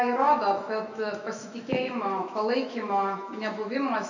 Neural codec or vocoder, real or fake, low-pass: autoencoder, 48 kHz, 128 numbers a frame, DAC-VAE, trained on Japanese speech; fake; 7.2 kHz